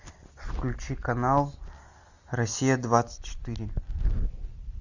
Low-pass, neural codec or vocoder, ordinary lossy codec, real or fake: 7.2 kHz; none; Opus, 64 kbps; real